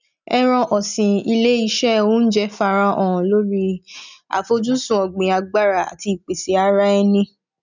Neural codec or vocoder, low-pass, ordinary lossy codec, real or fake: none; 7.2 kHz; none; real